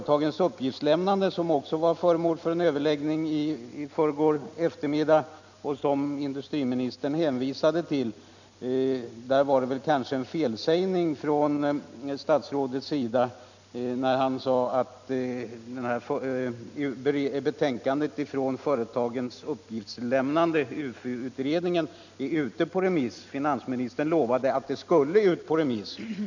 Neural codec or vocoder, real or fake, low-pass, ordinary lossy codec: none; real; 7.2 kHz; none